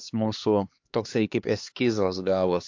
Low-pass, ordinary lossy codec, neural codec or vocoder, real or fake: 7.2 kHz; AAC, 48 kbps; codec, 16 kHz, 2 kbps, X-Codec, HuBERT features, trained on LibriSpeech; fake